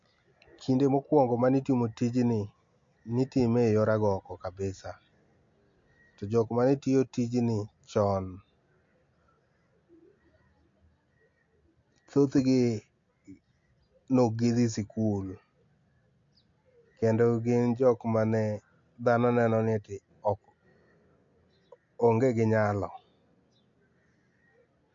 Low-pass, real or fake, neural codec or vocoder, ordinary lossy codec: 7.2 kHz; real; none; MP3, 48 kbps